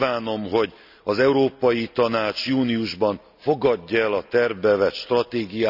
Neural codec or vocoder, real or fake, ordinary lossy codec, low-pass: none; real; none; 5.4 kHz